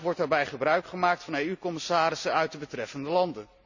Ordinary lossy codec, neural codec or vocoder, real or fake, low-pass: none; none; real; 7.2 kHz